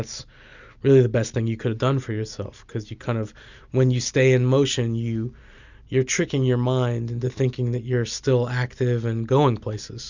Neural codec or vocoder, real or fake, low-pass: none; real; 7.2 kHz